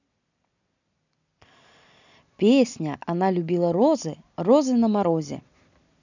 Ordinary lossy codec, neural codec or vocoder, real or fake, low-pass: none; none; real; 7.2 kHz